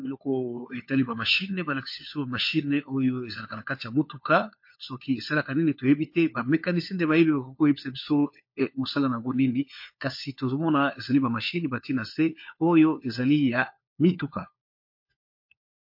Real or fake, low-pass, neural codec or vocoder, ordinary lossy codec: fake; 5.4 kHz; codec, 16 kHz, 4 kbps, FunCodec, trained on LibriTTS, 50 frames a second; MP3, 32 kbps